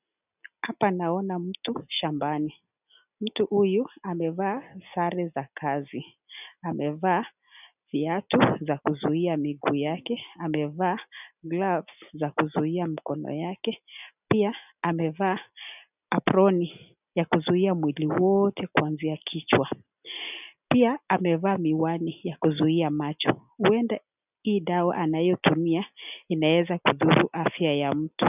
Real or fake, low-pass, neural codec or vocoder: real; 3.6 kHz; none